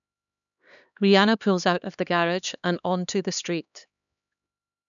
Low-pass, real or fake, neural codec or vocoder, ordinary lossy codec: 7.2 kHz; fake; codec, 16 kHz, 2 kbps, X-Codec, HuBERT features, trained on LibriSpeech; none